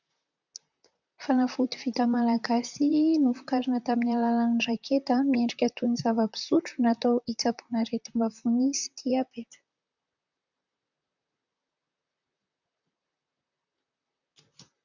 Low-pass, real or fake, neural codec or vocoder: 7.2 kHz; fake; vocoder, 44.1 kHz, 128 mel bands, Pupu-Vocoder